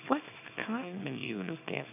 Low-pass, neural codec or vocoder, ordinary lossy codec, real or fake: 3.6 kHz; codec, 24 kHz, 0.9 kbps, WavTokenizer, small release; none; fake